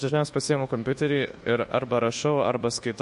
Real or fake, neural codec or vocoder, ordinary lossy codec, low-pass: fake; codec, 24 kHz, 1.2 kbps, DualCodec; MP3, 48 kbps; 10.8 kHz